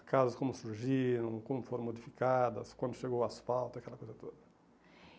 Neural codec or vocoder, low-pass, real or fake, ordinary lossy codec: none; none; real; none